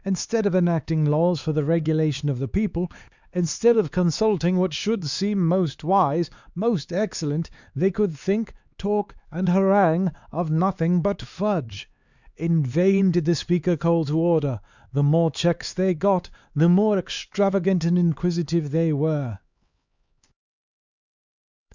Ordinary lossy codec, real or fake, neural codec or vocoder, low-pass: Opus, 64 kbps; fake; codec, 16 kHz, 4 kbps, X-Codec, HuBERT features, trained on LibriSpeech; 7.2 kHz